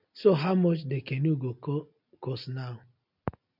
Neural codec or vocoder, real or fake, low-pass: none; real; 5.4 kHz